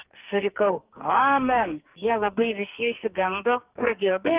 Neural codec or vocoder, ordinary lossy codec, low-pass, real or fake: codec, 44.1 kHz, 2.6 kbps, SNAC; Opus, 24 kbps; 3.6 kHz; fake